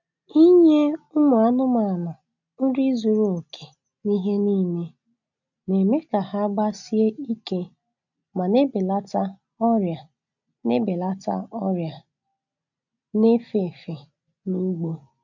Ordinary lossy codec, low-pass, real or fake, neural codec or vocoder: none; 7.2 kHz; real; none